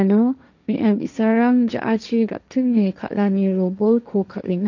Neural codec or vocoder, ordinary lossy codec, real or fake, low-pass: codec, 16 kHz, 1.1 kbps, Voila-Tokenizer; none; fake; 7.2 kHz